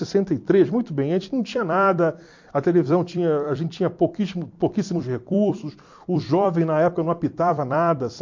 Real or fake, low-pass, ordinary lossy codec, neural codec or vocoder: fake; 7.2 kHz; MP3, 48 kbps; vocoder, 44.1 kHz, 128 mel bands every 256 samples, BigVGAN v2